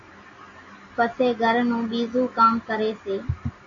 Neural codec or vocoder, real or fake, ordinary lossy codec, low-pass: none; real; AAC, 32 kbps; 7.2 kHz